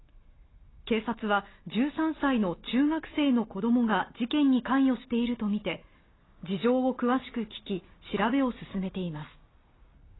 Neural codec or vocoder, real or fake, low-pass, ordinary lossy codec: none; real; 7.2 kHz; AAC, 16 kbps